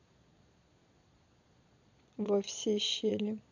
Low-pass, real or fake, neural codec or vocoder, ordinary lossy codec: 7.2 kHz; fake; vocoder, 22.05 kHz, 80 mel bands, Vocos; none